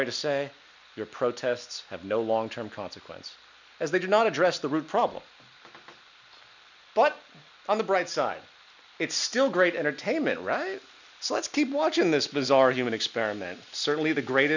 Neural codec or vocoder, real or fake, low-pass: none; real; 7.2 kHz